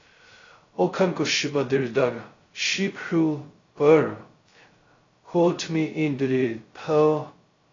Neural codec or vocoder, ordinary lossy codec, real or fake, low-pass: codec, 16 kHz, 0.2 kbps, FocalCodec; AAC, 32 kbps; fake; 7.2 kHz